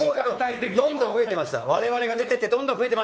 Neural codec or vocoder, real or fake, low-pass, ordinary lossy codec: codec, 16 kHz, 4 kbps, X-Codec, WavLM features, trained on Multilingual LibriSpeech; fake; none; none